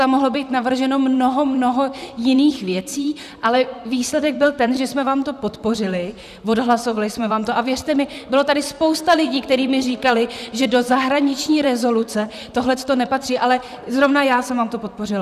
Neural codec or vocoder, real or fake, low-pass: vocoder, 44.1 kHz, 128 mel bands, Pupu-Vocoder; fake; 14.4 kHz